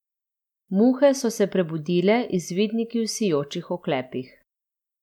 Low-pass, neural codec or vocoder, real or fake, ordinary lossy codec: 19.8 kHz; none; real; MP3, 96 kbps